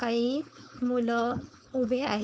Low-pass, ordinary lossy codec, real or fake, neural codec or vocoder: none; none; fake; codec, 16 kHz, 4.8 kbps, FACodec